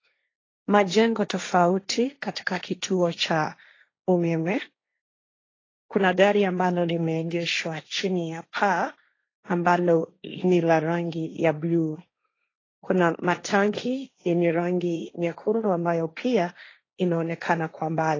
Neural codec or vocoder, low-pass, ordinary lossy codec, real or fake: codec, 16 kHz, 1.1 kbps, Voila-Tokenizer; 7.2 kHz; AAC, 32 kbps; fake